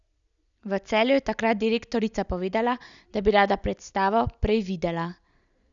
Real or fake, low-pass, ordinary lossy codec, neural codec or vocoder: real; 7.2 kHz; none; none